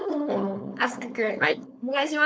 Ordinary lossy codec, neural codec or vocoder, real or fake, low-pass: none; codec, 16 kHz, 4.8 kbps, FACodec; fake; none